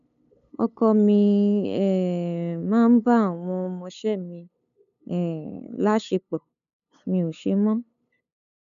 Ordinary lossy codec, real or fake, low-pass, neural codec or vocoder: none; fake; 7.2 kHz; codec, 16 kHz, 8 kbps, FunCodec, trained on LibriTTS, 25 frames a second